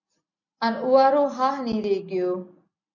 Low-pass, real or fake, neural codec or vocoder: 7.2 kHz; real; none